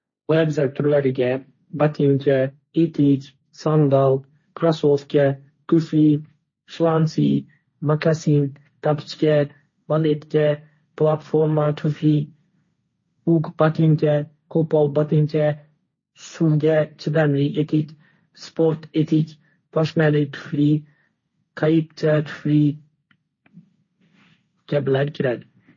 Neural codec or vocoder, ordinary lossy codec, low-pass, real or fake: codec, 16 kHz, 1.1 kbps, Voila-Tokenizer; MP3, 32 kbps; 7.2 kHz; fake